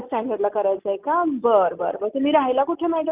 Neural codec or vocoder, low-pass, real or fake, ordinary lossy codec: none; 3.6 kHz; real; Opus, 24 kbps